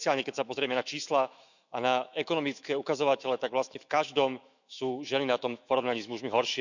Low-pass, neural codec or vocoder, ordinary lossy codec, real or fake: 7.2 kHz; autoencoder, 48 kHz, 128 numbers a frame, DAC-VAE, trained on Japanese speech; none; fake